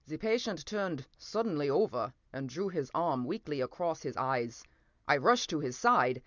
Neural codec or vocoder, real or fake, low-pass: none; real; 7.2 kHz